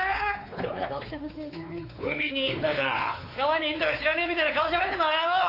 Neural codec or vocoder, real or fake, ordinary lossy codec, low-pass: codec, 16 kHz, 4 kbps, X-Codec, WavLM features, trained on Multilingual LibriSpeech; fake; AAC, 32 kbps; 5.4 kHz